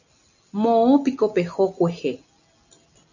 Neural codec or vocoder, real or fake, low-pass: none; real; 7.2 kHz